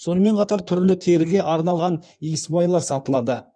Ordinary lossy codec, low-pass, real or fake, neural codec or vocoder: none; 9.9 kHz; fake; codec, 16 kHz in and 24 kHz out, 1.1 kbps, FireRedTTS-2 codec